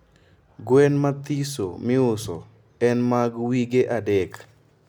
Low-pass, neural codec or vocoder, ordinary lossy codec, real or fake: 19.8 kHz; none; none; real